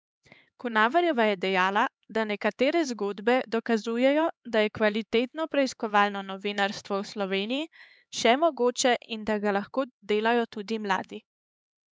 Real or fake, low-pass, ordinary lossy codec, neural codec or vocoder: fake; none; none; codec, 16 kHz, 4 kbps, X-Codec, HuBERT features, trained on LibriSpeech